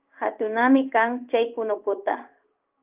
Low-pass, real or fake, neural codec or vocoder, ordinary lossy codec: 3.6 kHz; fake; codec, 16 kHz in and 24 kHz out, 1 kbps, XY-Tokenizer; Opus, 24 kbps